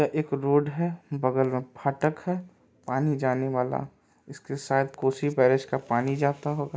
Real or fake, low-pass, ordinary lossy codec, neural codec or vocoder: real; none; none; none